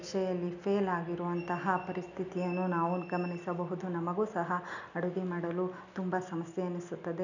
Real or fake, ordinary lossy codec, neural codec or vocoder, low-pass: real; none; none; 7.2 kHz